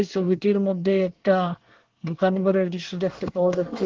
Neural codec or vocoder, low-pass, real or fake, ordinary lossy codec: codec, 24 kHz, 1 kbps, SNAC; 7.2 kHz; fake; Opus, 16 kbps